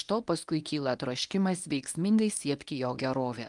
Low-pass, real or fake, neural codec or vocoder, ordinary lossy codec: 10.8 kHz; fake; codec, 24 kHz, 0.9 kbps, WavTokenizer, medium speech release version 1; Opus, 32 kbps